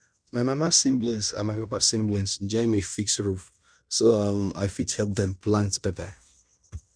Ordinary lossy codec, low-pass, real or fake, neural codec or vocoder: none; 9.9 kHz; fake; codec, 16 kHz in and 24 kHz out, 0.9 kbps, LongCat-Audio-Codec, fine tuned four codebook decoder